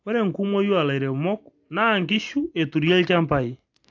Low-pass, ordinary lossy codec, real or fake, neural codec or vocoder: 7.2 kHz; AAC, 48 kbps; real; none